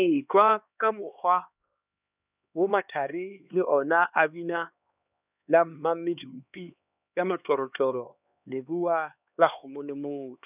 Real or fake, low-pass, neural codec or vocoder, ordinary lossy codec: fake; 3.6 kHz; codec, 16 kHz, 2 kbps, X-Codec, HuBERT features, trained on LibriSpeech; none